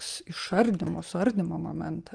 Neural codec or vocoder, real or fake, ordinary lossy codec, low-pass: none; real; Opus, 24 kbps; 9.9 kHz